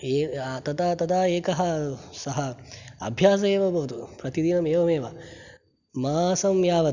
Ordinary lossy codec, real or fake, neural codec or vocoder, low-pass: none; real; none; 7.2 kHz